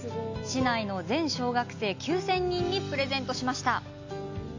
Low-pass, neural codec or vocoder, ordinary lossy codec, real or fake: 7.2 kHz; none; AAC, 48 kbps; real